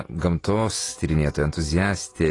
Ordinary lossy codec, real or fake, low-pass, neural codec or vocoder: AAC, 32 kbps; fake; 10.8 kHz; vocoder, 48 kHz, 128 mel bands, Vocos